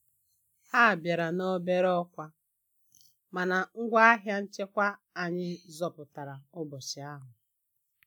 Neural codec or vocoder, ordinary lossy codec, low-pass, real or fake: none; none; none; real